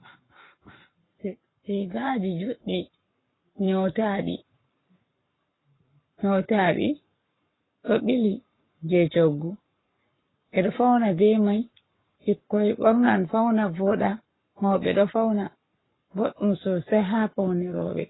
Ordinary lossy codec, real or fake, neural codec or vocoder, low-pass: AAC, 16 kbps; fake; codec, 44.1 kHz, 7.8 kbps, Pupu-Codec; 7.2 kHz